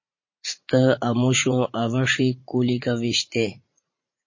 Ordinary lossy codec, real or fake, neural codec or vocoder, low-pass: MP3, 32 kbps; fake; vocoder, 44.1 kHz, 80 mel bands, Vocos; 7.2 kHz